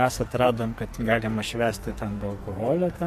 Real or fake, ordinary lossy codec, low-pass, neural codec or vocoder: fake; MP3, 64 kbps; 14.4 kHz; codec, 44.1 kHz, 2.6 kbps, SNAC